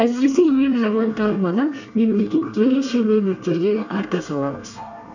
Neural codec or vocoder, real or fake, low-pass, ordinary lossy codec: codec, 24 kHz, 1 kbps, SNAC; fake; 7.2 kHz; none